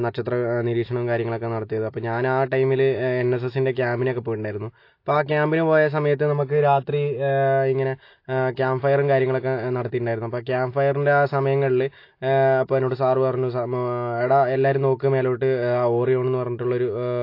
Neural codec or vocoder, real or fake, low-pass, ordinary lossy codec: none; real; 5.4 kHz; AAC, 32 kbps